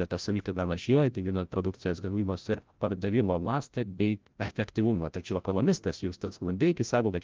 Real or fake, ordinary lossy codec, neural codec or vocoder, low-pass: fake; Opus, 24 kbps; codec, 16 kHz, 0.5 kbps, FreqCodec, larger model; 7.2 kHz